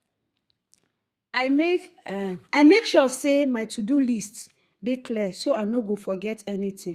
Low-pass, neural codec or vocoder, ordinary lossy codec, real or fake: 14.4 kHz; codec, 32 kHz, 1.9 kbps, SNAC; Opus, 64 kbps; fake